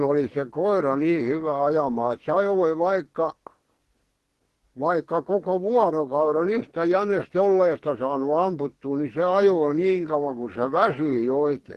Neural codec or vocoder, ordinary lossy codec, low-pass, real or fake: codec, 44.1 kHz, 2.6 kbps, SNAC; Opus, 16 kbps; 14.4 kHz; fake